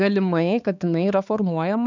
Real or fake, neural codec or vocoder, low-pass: fake; codec, 16 kHz, 4 kbps, X-Codec, HuBERT features, trained on balanced general audio; 7.2 kHz